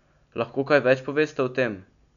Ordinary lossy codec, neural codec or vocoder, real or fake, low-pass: none; none; real; 7.2 kHz